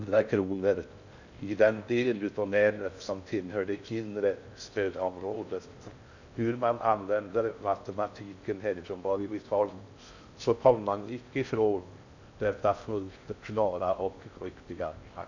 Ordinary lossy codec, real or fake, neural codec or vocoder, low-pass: none; fake; codec, 16 kHz in and 24 kHz out, 0.6 kbps, FocalCodec, streaming, 4096 codes; 7.2 kHz